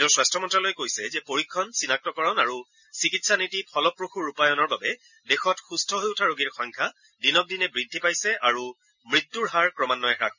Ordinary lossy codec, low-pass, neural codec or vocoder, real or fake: none; 7.2 kHz; none; real